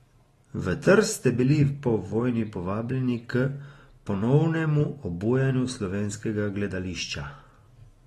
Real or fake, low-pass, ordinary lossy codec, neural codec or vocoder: real; 19.8 kHz; AAC, 32 kbps; none